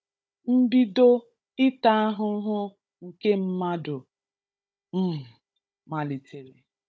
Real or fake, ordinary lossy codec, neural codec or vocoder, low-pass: fake; none; codec, 16 kHz, 16 kbps, FunCodec, trained on Chinese and English, 50 frames a second; none